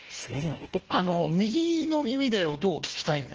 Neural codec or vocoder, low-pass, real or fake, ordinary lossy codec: codec, 16 kHz, 1 kbps, FunCodec, trained on Chinese and English, 50 frames a second; 7.2 kHz; fake; Opus, 16 kbps